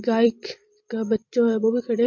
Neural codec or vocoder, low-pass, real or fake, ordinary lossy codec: vocoder, 44.1 kHz, 128 mel bands every 256 samples, BigVGAN v2; 7.2 kHz; fake; MP3, 48 kbps